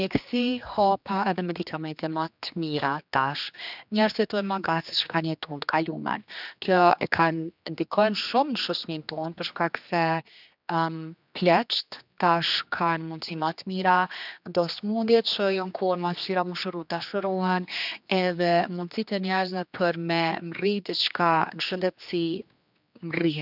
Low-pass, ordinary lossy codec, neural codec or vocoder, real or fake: 5.4 kHz; none; codec, 16 kHz, 2 kbps, X-Codec, HuBERT features, trained on general audio; fake